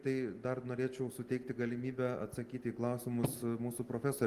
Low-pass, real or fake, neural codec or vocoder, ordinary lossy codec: 14.4 kHz; real; none; Opus, 24 kbps